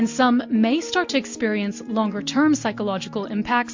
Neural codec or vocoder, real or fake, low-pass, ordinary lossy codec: none; real; 7.2 kHz; MP3, 48 kbps